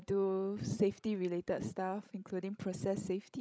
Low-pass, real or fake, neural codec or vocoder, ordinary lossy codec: none; fake; codec, 16 kHz, 16 kbps, FreqCodec, larger model; none